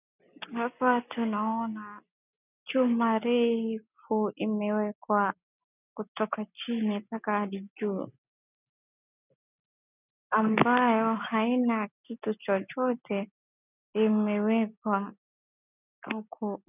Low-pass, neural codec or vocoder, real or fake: 3.6 kHz; none; real